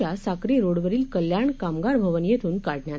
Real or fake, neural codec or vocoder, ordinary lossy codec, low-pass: real; none; none; none